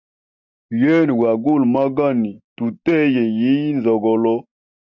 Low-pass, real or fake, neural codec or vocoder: 7.2 kHz; real; none